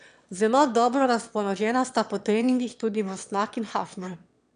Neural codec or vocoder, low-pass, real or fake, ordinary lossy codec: autoencoder, 22.05 kHz, a latent of 192 numbers a frame, VITS, trained on one speaker; 9.9 kHz; fake; none